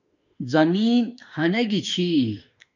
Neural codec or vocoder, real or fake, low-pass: autoencoder, 48 kHz, 32 numbers a frame, DAC-VAE, trained on Japanese speech; fake; 7.2 kHz